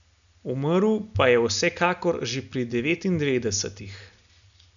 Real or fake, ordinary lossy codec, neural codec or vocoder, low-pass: real; none; none; 7.2 kHz